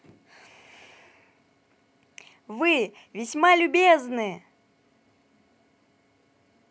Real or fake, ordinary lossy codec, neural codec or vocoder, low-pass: real; none; none; none